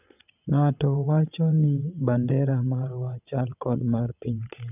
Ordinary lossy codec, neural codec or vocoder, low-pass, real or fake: none; vocoder, 22.05 kHz, 80 mel bands, WaveNeXt; 3.6 kHz; fake